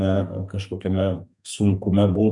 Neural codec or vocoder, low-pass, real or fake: codec, 32 kHz, 1.9 kbps, SNAC; 10.8 kHz; fake